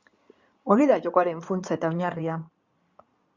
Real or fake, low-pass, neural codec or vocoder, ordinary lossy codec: fake; 7.2 kHz; codec, 16 kHz in and 24 kHz out, 2.2 kbps, FireRedTTS-2 codec; Opus, 64 kbps